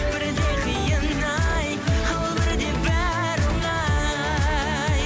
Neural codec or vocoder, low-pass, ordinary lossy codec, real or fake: none; none; none; real